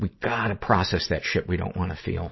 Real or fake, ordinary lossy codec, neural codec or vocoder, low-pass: real; MP3, 24 kbps; none; 7.2 kHz